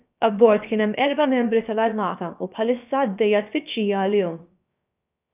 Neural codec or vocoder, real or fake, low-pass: codec, 16 kHz, about 1 kbps, DyCAST, with the encoder's durations; fake; 3.6 kHz